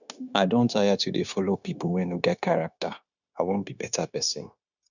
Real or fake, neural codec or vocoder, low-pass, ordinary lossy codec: fake; codec, 16 kHz, 0.9 kbps, LongCat-Audio-Codec; 7.2 kHz; none